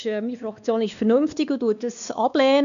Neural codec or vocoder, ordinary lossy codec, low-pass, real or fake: codec, 16 kHz, 2 kbps, X-Codec, WavLM features, trained on Multilingual LibriSpeech; none; 7.2 kHz; fake